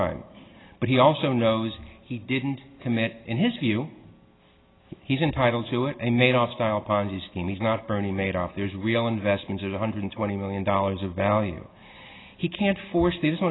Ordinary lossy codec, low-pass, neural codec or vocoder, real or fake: AAC, 16 kbps; 7.2 kHz; none; real